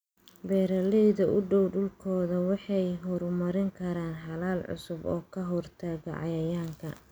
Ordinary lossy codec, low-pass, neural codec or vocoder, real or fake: none; none; none; real